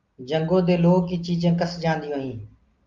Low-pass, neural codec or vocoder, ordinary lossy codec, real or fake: 7.2 kHz; none; Opus, 32 kbps; real